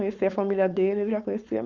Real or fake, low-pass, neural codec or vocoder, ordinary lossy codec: fake; 7.2 kHz; codec, 16 kHz, 4.8 kbps, FACodec; Opus, 64 kbps